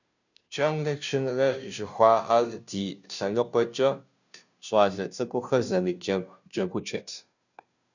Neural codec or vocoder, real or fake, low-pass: codec, 16 kHz, 0.5 kbps, FunCodec, trained on Chinese and English, 25 frames a second; fake; 7.2 kHz